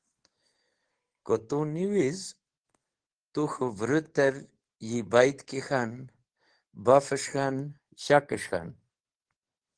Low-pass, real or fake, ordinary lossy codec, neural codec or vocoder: 9.9 kHz; fake; Opus, 16 kbps; codec, 44.1 kHz, 7.8 kbps, DAC